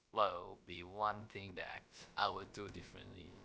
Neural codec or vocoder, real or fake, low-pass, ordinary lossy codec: codec, 16 kHz, about 1 kbps, DyCAST, with the encoder's durations; fake; none; none